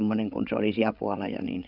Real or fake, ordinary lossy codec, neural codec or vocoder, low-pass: fake; MP3, 48 kbps; codec, 16 kHz, 16 kbps, FreqCodec, larger model; 5.4 kHz